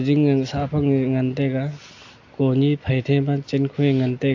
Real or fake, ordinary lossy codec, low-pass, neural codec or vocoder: real; AAC, 48 kbps; 7.2 kHz; none